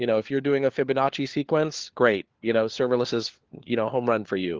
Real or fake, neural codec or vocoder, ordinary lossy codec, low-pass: fake; codec, 16 kHz, 4 kbps, FunCodec, trained on LibriTTS, 50 frames a second; Opus, 16 kbps; 7.2 kHz